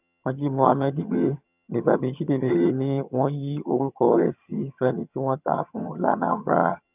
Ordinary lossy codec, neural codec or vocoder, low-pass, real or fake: none; vocoder, 22.05 kHz, 80 mel bands, HiFi-GAN; 3.6 kHz; fake